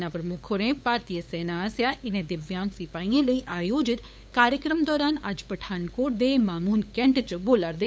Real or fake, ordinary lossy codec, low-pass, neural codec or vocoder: fake; none; none; codec, 16 kHz, 8 kbps, FunCodec, trained on LibriTTS, 25 frames a second